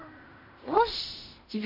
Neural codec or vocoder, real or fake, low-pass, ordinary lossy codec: codec, 16 kHz in and 24 kHz out, 0.4 kbps, LongCat-Audio-Codec, fine tuned four codebook decoder; fake; 5.4 kHz; MP3, 24 kbps